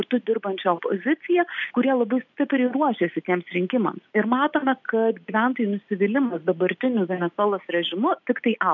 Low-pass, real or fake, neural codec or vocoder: 7.2 kHz; real; none